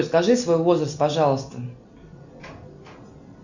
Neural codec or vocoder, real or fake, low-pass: none; real; 7.2 kHz